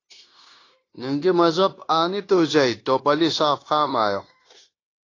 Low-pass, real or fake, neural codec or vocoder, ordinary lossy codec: 7.2 kHz; fake; codec, 16 kHz, 0.9 kbps, LongCat-Audio-Codec; AAC, 32 kbps